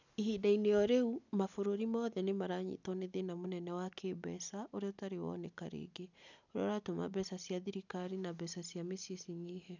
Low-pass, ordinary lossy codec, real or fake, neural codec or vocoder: 7.2 kHz; none; real; none